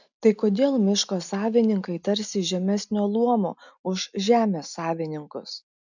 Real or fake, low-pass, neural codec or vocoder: real; 7.2 kHz; none